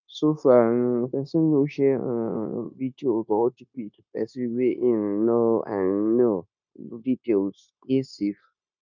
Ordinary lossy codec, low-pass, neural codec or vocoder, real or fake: none; 7.2 kHz; codec, 16 kHz, 0.9 kbps, LongCat-Audio-Codec; fake